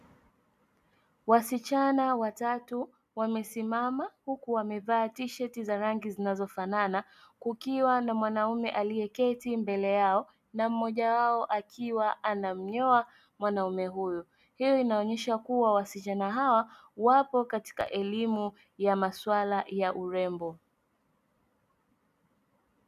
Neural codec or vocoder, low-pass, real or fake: none; 14.4 kHz; real